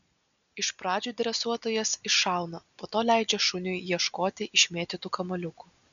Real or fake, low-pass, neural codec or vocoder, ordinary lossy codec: real; 7.2 kHz; none; MP3, 96 kbps